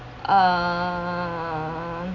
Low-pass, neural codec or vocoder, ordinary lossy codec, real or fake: 7.2 kHz; none; none; real